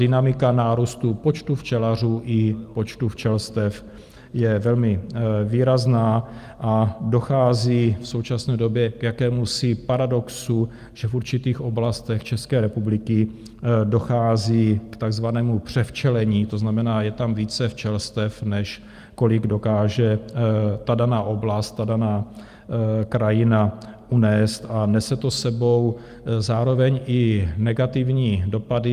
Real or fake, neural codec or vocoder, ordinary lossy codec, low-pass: real; none; Opus, 32 kbps; 14.4 kHz